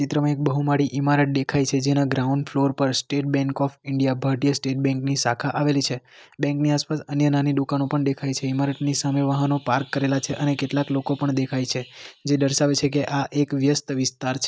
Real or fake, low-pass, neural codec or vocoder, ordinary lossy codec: real; none; none; none